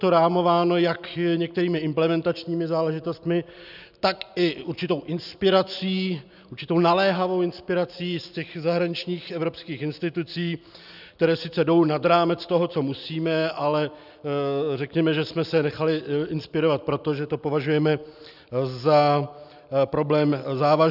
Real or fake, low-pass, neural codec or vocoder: real; 5.4 kHz; none